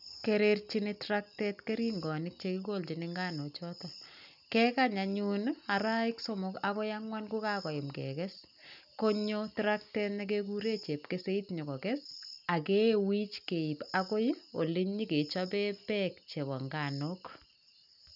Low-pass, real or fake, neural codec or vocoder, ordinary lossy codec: 5.4 kHz; real; none; none